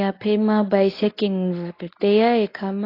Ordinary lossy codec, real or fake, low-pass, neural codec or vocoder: AAC, 32 kbps; fake; 5.4 kHz; codec, 24 kHz, 0.9 kbps, WavTokenizer, medium speech release version 1